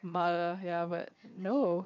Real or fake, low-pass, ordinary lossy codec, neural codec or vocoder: fake; 7.2 kHz; none; vocoder, 22.05 kHz, 80 mel bands, WaveNeXt